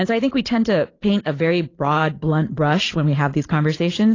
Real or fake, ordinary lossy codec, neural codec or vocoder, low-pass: fake; AAC, 32 kbps; vocoder, 44.1 kHz, 128 mel bands every 512 samples, BigVGAN v2; 7.2 kHz